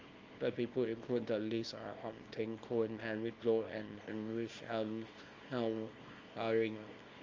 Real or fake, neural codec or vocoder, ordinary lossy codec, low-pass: fake; codec, 24 kHz, 0.9 kbps, WavTokenizer, small release; Opus, 32 kbps; 7.2 kHz